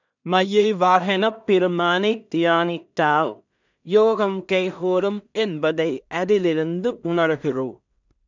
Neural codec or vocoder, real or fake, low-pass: codec, 16 kHz in and 24 kHz out, 0.4 kbps, LongCat-Audio-Codec, two codebook decoder; fake; 7.2 kHz